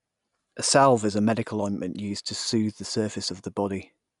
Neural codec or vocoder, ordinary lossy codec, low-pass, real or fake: none; none; 10.8 kHz; real